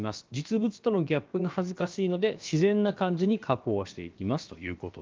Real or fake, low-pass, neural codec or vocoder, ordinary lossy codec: fake; 7.2 kHz; codec, 16 kHz, about 1 kbps, DyCAST, with the encoder's durations; Opus, 32 kbps